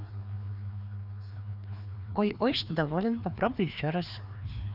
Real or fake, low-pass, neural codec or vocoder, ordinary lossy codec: fake; 5.4 kHz; codec, 16 kHz, 2 kbps, FreqCodec, larger model; none